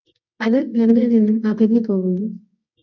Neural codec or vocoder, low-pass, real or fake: codec, 24 kHz, 0.9 kbps, WavTokenizer, medium music audio release; 7.2 kHz; fake